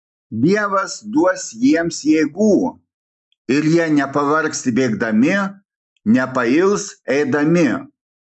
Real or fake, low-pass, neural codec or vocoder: real; 10.8 kHz; none